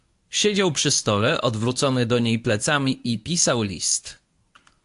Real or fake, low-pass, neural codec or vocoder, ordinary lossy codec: fake; 10.8 kHz; codec, 24 kHz, 0.9 kbps, WavTokenizer, medium speech release version 1; AAC, 64 kbps